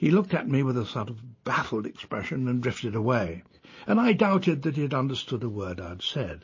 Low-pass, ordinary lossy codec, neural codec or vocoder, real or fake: 7.2 kHz; MP3, 32 kbps; none; real